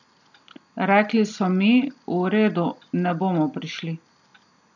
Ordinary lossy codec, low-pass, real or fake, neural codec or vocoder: none; 7.2 kHz; real; none